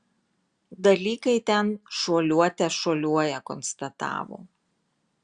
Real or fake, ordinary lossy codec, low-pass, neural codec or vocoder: real; Opus, 64 kbps; 9.9 kHz; none